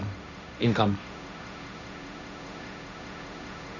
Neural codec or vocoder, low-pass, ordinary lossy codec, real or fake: codec, 16 kHz, 1.1 kbps, Voila-Tokenizer; 7.2 kHz; none; fake